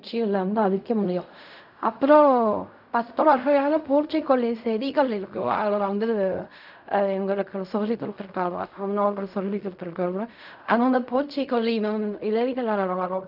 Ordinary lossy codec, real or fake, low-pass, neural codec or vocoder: none; fake; 5.4 kHz; codec, 16 kHz in and 24 kHz out, 0.4 kbps, LongCat-Audio-Codec, fine tuned four codebook decoder